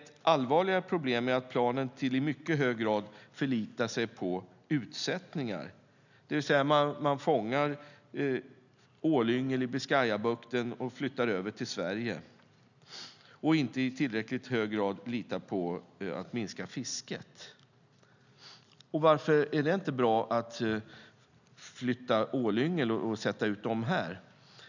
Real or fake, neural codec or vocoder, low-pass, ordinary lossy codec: real; none; 7.2 kHz; none